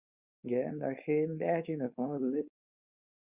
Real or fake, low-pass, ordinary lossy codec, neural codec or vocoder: fake; 3.6 kHz; Opus, 64 kbps; codec, 16 kHz, 4.8 kbps, FACodec